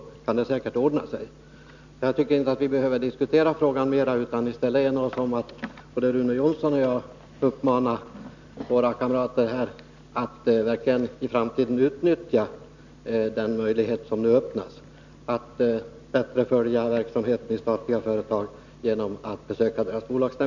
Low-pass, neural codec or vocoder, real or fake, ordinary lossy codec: 7.2 kHz; none; real; none